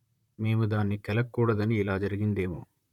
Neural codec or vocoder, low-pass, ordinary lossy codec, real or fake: vocoder, 44.1 kHz, 128 mel bands, Pupu-Vocoder; 19.8 kHz; none; fake